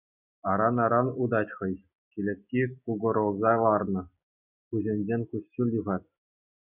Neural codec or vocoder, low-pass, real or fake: none; 3.6 kHz; real